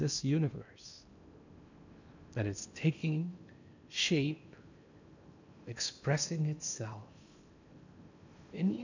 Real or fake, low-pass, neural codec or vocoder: fake; 7.2 kHz; codec, 16 kHz in and 24 kHz out, 0.8 kbps, FocalCodec, streaming, 65536 codes